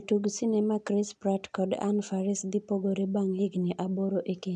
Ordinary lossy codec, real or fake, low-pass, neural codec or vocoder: none; real; 9.9 kHz; none